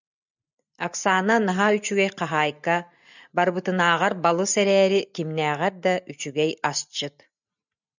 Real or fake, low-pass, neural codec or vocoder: real; 7.2 kHz; none